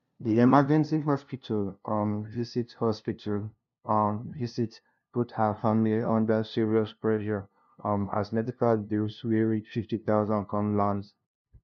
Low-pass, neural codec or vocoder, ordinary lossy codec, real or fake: 7.2 kHz; codec, 16 kHz, 0.5 kbps, FunCodec, trained on LibriTTS, 25 frames a second; none; fake